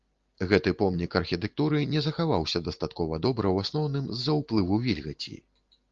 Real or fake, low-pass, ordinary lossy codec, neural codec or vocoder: real; 7.2 kHz; Opus, 32 kbps; none